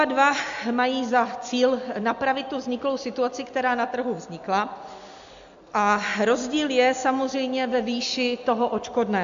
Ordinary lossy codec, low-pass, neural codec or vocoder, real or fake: AAC, 64 kbps; 7.2 kHz; none; real